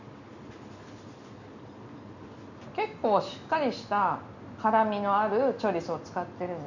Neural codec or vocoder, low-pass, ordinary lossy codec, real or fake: none; 7.2 kHz; none; real